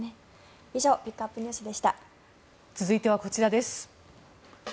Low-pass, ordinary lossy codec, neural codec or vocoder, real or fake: none; none; none; real